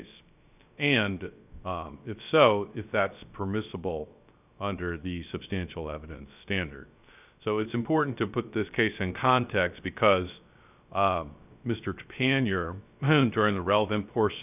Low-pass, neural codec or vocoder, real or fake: 3.6 kHz; codec, 16 kHz, 0.3 kbps, FocalCodec; fake